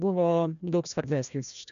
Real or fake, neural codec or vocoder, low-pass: fake; codec, 16 kHz, 1 kbps, FreqCodec, larger model; 7.2 kHz